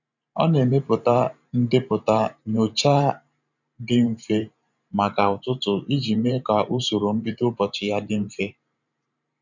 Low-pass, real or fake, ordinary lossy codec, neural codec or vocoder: 7.2 kHz; fake; none; vocoder, 44.1 kHz, 128 mel bands every 512 samples, BigVGAN v2